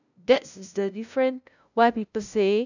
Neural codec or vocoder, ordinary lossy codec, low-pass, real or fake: codec, 16 kHz, 0.5 kbps, FunCodec, trained on LibriTTS, 25 frames a second; none; 7.2 kHz; fake